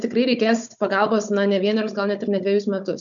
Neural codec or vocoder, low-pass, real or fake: codec, 16 kHz, 16 kbps, FunCodec, trained on Chinese and English, 50 frames a second; 7.2 kHz; fake